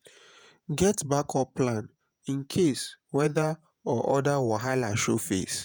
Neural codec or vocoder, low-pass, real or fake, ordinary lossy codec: vocoder, 48 kHz, 128 mel bands, Vocos; none; fake; none